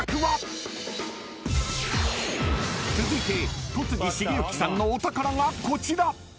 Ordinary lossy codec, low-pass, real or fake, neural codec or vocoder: none; none; real; none